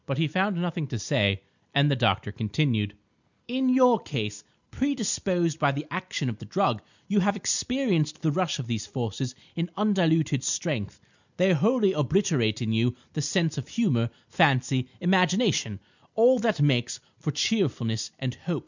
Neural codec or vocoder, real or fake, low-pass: none; real; 7.2 kHz